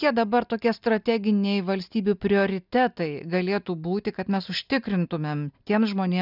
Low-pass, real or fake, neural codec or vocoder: 5.4 kHz; real; none